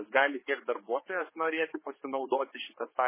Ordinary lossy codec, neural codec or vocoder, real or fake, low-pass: MP3, 16 kbps; codec, 16 kHz, 4 kbps, X-Codec, HuBERT features, trained on general audio; fake; 3.6 kHz